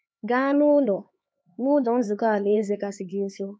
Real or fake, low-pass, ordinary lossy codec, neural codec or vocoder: fake; none; none; codec, 16 kHz, 4 kbps, X-Codec, HuBERT features, trained on LibriSpeech